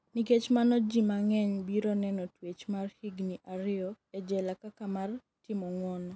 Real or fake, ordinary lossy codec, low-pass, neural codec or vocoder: real; none; none; none